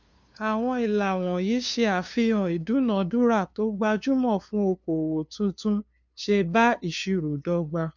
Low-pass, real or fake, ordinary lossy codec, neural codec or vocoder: 7.2 kHz; fake; MP3, 64 kbps; codec, 16 kHz, 2 kbps, FunCodec, trained on LibriTTS, 25 frames a second